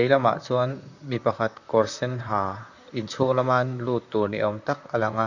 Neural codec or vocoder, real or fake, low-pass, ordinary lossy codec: vocoder, 44.1 kHz, 128 mel bands, Pupu-Vocoder; fake; 7.2 kHz; none